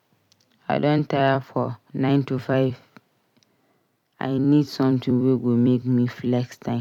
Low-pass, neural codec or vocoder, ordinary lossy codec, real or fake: 19.8 kHz; vocoder, 44.1 kHz, 128 mel bands every 256 samples, BigVGAN v2; none; fake